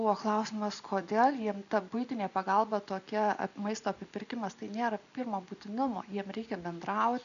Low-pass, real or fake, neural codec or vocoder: 7.2 kHz; real; none